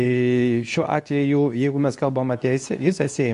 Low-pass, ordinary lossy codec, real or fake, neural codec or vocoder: 10.8 kHz; AAC, 64 kbps; fake; codec, 24 kHz, 0.9 kbps, WavTokenizer, medium speech release version 2